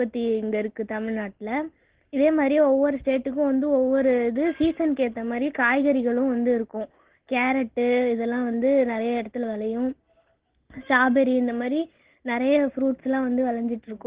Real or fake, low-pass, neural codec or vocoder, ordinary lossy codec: real; 3.6 kHz; none; Opus, 16 kbps